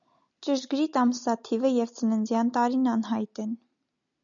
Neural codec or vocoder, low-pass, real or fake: none; 7.2 kHz; real